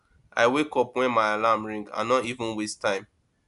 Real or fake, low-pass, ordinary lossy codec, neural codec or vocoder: real; 10.8 kHz; MP3, 96 kbps; none